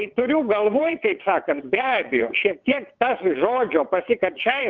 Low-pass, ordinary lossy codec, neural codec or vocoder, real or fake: 7.2 kHz; Opus, 24 kbps; vocoder, 22.05 kHz, 80 mel bands, WaveNeXt; fake